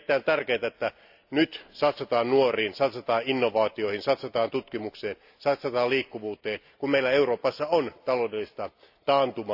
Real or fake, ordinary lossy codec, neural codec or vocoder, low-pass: real; AAC, 48 kbps; none; 5.4 kHz